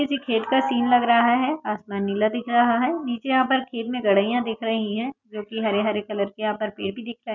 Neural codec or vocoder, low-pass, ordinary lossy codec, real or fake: none; 7.2 kHz; none; real